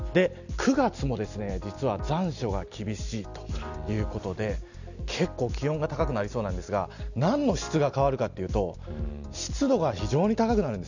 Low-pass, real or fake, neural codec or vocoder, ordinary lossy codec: 7.2 kHz; real; none; none